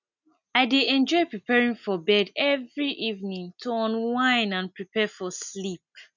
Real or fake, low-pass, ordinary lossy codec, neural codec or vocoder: real; 7.2 kHz; none; none